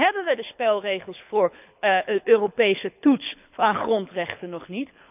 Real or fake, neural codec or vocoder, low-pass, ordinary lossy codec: fake; codec, 24 kHz, 6 kbps, HILCodec; 3.6 kHz; none